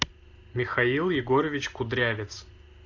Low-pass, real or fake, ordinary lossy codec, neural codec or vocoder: 7.2 kHz; real; AAC, 48 kbps; none